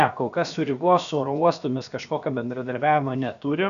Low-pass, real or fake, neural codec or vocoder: 7.2 kHz; fake; codec, 16 kHz, about 1 kbps, DyCAST, with the encoder's durations